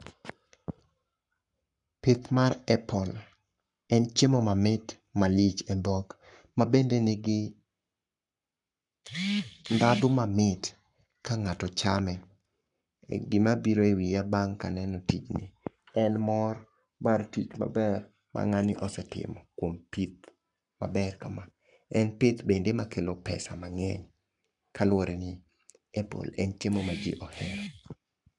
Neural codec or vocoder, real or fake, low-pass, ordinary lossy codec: codec, 44.1 kHz, 7.8 kbps, Pupu-Codec; fake; 10.8 kHz; none